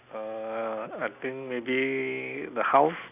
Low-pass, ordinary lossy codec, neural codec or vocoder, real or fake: 3.6 kHz; none; none; real